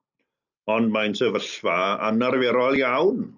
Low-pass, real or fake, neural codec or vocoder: 7.2 kHz; real; none